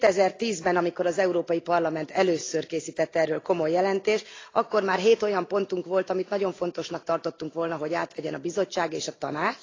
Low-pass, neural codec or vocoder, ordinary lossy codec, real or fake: 7.2 kHz; none; AAC, 32 kbps; real